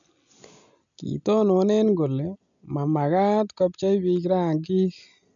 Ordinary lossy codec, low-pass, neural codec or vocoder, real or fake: none; 7.2 kHz; none; real